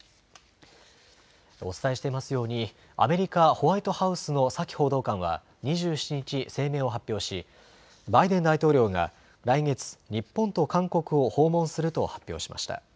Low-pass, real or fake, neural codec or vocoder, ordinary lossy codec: none; real; none; none